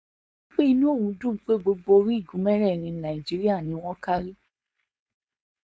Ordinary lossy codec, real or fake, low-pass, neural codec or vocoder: none; fake; none; codec, 16 kHz, 4.8 kbps, FACodec